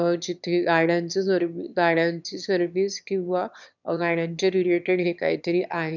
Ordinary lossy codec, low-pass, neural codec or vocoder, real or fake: none; 7.2 kHz; autoencoder, 22.05 kHz, a latent of 192 numbers a frame, VITS, trained on one speaker; fake